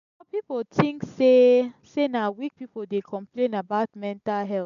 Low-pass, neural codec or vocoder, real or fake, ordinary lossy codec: 7.2 kHz; none; real; none